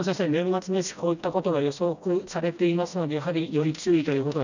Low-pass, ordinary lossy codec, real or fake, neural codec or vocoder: 7.2 kHz; none; fake; codec, 16 kHz, 1 kbps, FreqCodec, smaller model